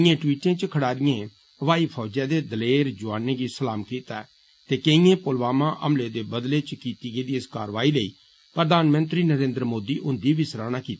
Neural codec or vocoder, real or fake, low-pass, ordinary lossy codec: none; real; none; none